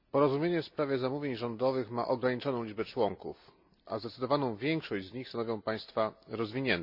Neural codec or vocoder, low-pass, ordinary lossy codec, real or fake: none; 5.4 kHz; none; real